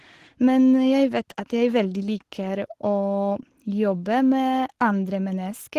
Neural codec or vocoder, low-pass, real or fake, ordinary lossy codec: codec, 44.1 kHz, 7.8 kbps, Pupu-Codec; 14.4 kHz; fake; Opus, 16 kbps